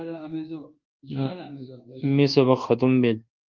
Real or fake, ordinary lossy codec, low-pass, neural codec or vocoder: fake; Opus, 32 kbps; 7.2 kHz; codec, 24 kHz, 1.2 kbps, DualCodec